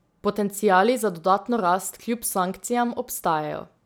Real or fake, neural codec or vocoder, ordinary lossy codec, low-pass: real; none; none; none